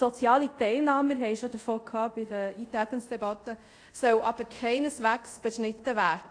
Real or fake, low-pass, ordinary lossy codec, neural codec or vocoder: fake; 9.9 kHz; AAC, 48 kbps; codec, 24 kHz, 0.5 kbps, DualCodec